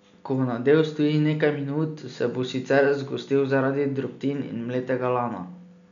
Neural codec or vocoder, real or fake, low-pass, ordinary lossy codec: none; real; 7.2 kHz; none